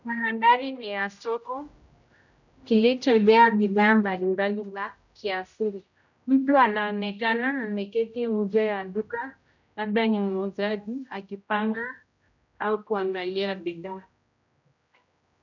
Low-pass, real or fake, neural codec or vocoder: 7.2 kHz; fake; codec, 16 kHz, 0.5 kbps, X-Codec, HuBERT features, trained on general audio